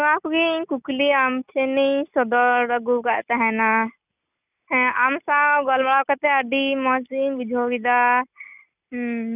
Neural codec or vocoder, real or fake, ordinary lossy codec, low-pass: none; real; none; 3.6 kHz